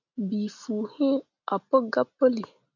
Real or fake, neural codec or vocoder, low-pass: real; none; 7.2 kHz